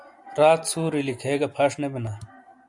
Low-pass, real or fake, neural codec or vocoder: 10.8 kHz; real; none